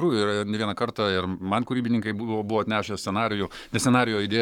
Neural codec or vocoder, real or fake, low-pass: codec, 44.1 kHz, 7.8 kbps, Pupu-Codec; fake; 19.8 kHz